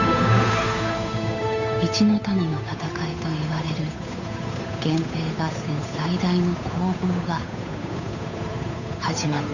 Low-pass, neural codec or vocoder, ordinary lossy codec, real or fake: 7.2 kHz; codec, 16 kHz, 8 kbps, FunCodec, trained on Chinese and English, 25 frames a second; none; fake